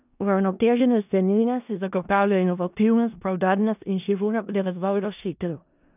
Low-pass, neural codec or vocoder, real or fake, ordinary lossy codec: 3.6 kHz; codec, 16 kHz in and 24 kHz out, 0.4 kbps, LongCat-Audio-Codec, four codebook decoder; fake; none